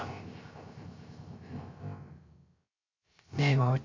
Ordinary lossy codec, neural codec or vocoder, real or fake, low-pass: MP3, 48 kbps; codec, 16 kHz, 0.3 kbps, FocalCodec; fake; 7.2 kHz